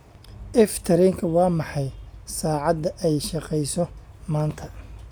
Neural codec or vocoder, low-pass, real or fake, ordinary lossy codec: none; none; real; none